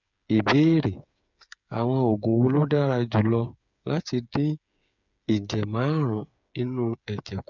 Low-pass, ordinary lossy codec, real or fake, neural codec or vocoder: 7.2 kHz; none; fake; codec, 16 kHz, 8 kbps, FreqCodec, smaller model